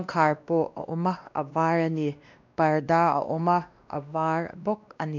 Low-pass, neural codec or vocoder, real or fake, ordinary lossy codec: 7.2 kHz; codec, 16 kHz, 1 kbps, X-Codec, WavLM features, trained on Multilingual LibriSpeech; fake; none